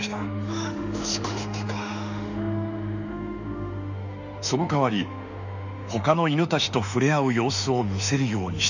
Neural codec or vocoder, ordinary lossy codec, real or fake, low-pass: autoencoder, 48 kHz, 32 numbers a frame, DAC-VAE, trained on Japanese speech; none; fake; 7.2 kHz